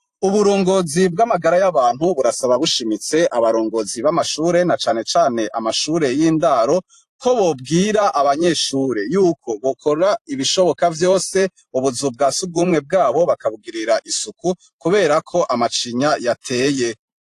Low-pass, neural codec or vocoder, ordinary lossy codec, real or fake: 14.4 kHz; vocoder, 44.1 kHz, 128 mel bands every 512 samples, BigVGAN v2; AAC, 64 kbps; fake